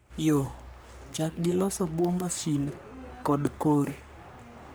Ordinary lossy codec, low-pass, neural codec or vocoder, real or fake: none; none; codec, 44.1 kHz, 3.4 kbps, Pupu-Codec; fake